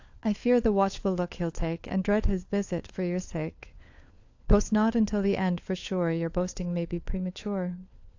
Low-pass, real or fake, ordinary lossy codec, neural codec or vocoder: 7.2 kHz; fake; AAC, 48 kbps; codec, 16 kHz, 4 kbps, FunCodec, trained on LibriTTS, 50 frames a second